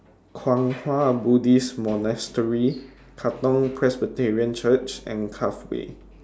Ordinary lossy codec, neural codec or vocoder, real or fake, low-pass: none; none; real; none